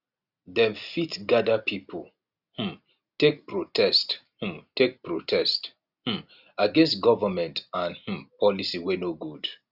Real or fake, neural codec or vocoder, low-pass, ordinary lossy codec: real; none; 5.4 kHz; Opus, 64 kbps